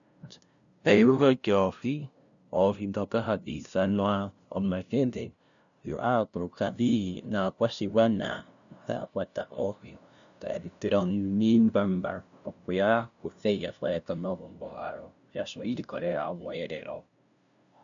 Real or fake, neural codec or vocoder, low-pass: fake; codec, 16 kHz, 0.5 kbps, FunCodec, trained on LibriTTS, 25 frames a second; 7.2 kHz